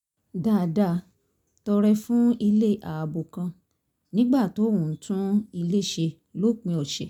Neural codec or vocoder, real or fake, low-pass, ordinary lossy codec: none; real; 19.8 kHz; none